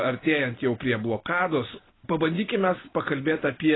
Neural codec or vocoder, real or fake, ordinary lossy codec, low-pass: none; real; AAC, 16 kbps; 7.2 kHz